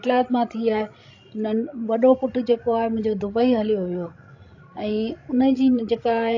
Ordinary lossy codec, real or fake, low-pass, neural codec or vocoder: AAC, 48 kbps; fake; 7.2 kHz; codec, 16 kHz, 16 kbps, FreqCodec, larger model